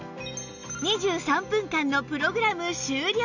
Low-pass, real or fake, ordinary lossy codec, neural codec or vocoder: 7.2 kHz; real; none; none